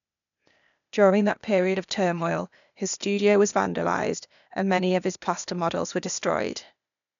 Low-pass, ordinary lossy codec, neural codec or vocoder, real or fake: 7.2 kHz; none; codec, 16 kHz, 0.8 kbps, ZipCodec; fake